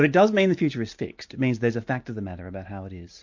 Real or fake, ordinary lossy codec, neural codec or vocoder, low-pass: real; MP3, 48 kbps; none; 7.2 kHz